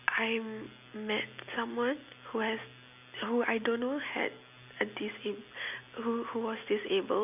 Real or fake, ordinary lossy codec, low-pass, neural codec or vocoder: real; AAC, 32 kbps; 3.6 kHz; none